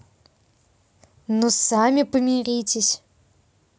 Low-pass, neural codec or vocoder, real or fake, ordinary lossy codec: none; none; real; none